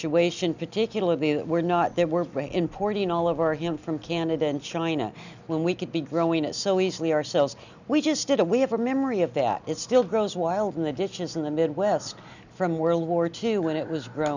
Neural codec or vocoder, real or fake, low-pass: none; real; 7.2 kHz